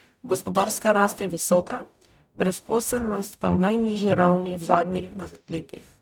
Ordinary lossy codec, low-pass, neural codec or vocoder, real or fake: none; none; codec, 44.1 kHz, 0.9 kbps, DAC; fake